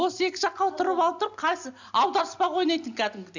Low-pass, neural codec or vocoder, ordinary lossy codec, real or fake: 7.2 kHz; none; none; real